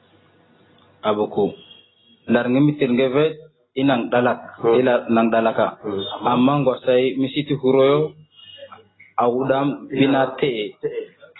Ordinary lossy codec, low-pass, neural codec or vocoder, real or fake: AAC, 16 kbps; 7.2 kHz; none; real